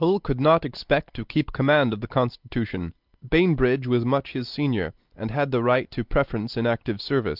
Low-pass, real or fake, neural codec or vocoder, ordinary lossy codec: 5.4 kHz; real; none; Opus, 32 kbps